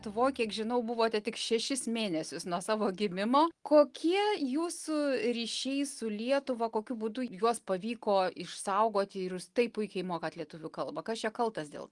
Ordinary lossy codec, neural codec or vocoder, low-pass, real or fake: Opus, 24 kbps; none; 10.8 kHz; real